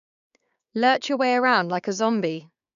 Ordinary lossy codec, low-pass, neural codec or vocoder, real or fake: none; 7.2 kHz; codec, 16 kHz, 6 kbps, DAC; fake